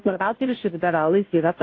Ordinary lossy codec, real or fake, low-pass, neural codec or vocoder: AAC, 32 kbps; fake; 7.2 kHz; codec, 16 kHz, 0.5 kbps, FunCodec, trained on Chinese and English, 25 frames a second